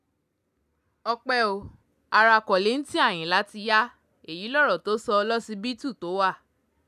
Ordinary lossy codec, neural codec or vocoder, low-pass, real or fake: none; none; 14.4 kHz; real